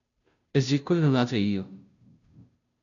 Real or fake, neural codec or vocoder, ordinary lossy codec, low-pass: fake; codec, 16 kHz, 0.5 kbps, FunCodec, trained on Chinese and English, 25 frames a second; MP3, 96 kbps; 7.2 kHz